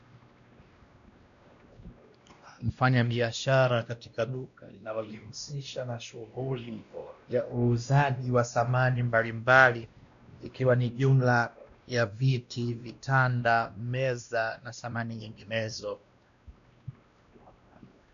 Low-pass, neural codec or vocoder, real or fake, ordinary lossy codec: 7.2 kHz; codec, 16 kHz, 1 kbps, X-Codec, WavLM features, trained on Multilingual LibriSpeech; fake; MP3, 96 kbps